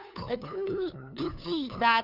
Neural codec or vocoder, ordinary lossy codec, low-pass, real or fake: codec, 16 kHz, 2 kbps, FunCodec, trained on LibriTTS, 25 frames a second; none; 5.4 kHz; fake